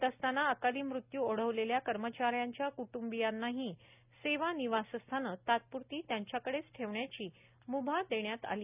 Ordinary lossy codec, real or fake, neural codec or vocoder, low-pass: none; real; none; 3.6 kHz